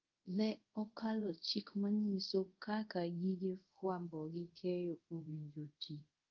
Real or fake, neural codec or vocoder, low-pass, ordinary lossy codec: fake; codec, 24 kHz, 0.5 kbps, DualCodec; 7.2 kHz; Opus, 32 kbps